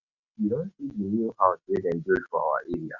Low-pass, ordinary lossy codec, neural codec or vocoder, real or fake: 7.2 kHz; none; none; real